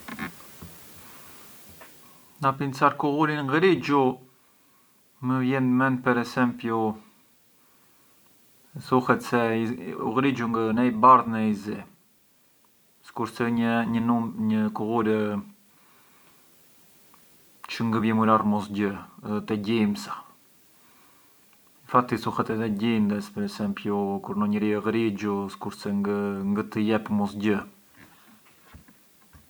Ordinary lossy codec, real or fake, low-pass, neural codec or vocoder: none; real; none; none